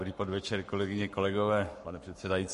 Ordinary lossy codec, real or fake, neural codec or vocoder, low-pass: MP3, 48 kbps; fake; codec, 44.1 kHz, 7.8 kbps, Pupu-Codec; 14.4 kHz